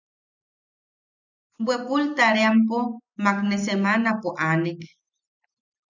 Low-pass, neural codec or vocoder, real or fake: 7.2 kHz; none; real